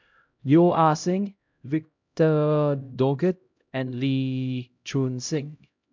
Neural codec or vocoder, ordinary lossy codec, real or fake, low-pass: codec, 16 kHz, 0.5 kbps, X-Codec, HuBERT features, trained on LibriSpeech; MP3, 64 kbps; fake; 7.2 kHz